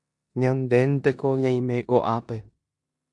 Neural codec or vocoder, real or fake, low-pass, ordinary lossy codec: codec, 16 kHz in and 24 kHz out, 0.9 kbps, LongCat-Audio-Codec, four codebook decoder; fake; 10.8 kHz; AAC, 64 kbps